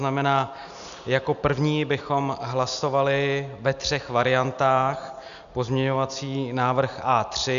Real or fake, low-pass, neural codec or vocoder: real; 7.2 kHz; none